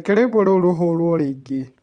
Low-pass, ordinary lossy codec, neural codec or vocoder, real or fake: 9.9 kHz; Opus, 64 kbps; vocoder, 22.05 kHz, 80 mel bands, WaveNeXt; fake